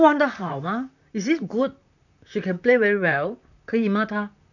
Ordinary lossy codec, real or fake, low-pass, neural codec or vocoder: none; fake; 7.2 kHz; vocoder, 44.1 kHz, 128 mel bands, Pupu-Vocoder